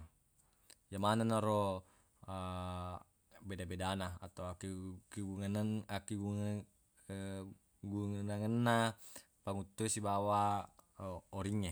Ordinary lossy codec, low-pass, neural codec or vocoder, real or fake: none; none; none; real